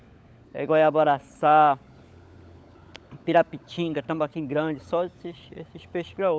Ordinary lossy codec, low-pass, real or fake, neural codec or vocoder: none; none; fake; codec, 16 kHz, 16 kbps, FunCodec, trained on LibriTTS, 50 frames a second